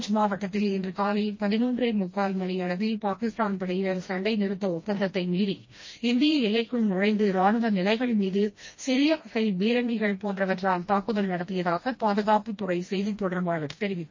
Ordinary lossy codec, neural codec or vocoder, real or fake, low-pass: MP3, 32 kbps; codec, 16 kHz, 1 kbps, FreqCodec, smaller model; fake; 7.2 kHz